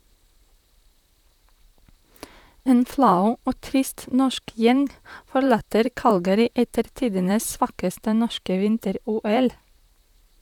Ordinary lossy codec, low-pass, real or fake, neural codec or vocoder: none; 19.8 kHz; fake; vocoder, 44.1 kHz, 128 mel bands, Pupu-Vocoder